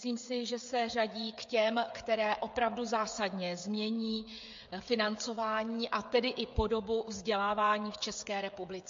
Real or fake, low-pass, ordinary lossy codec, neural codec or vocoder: fake; 7.2 kHz; MP3, 48 kbps; codec, 16 kHz, 16 kbps, FreqCodec, smaller model